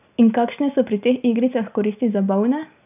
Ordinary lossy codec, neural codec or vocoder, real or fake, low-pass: none; none; real; 3.6 kHz